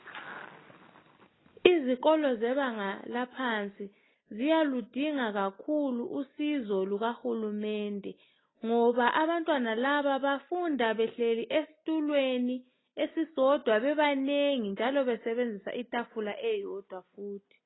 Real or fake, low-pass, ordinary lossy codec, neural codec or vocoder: real; 7.2 kHz; AAC, 16 kbps; none